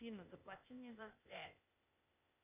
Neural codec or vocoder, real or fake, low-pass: codec, 16 kHz, 0.8 kbps, ZipCodec; fake; 3.6 kHz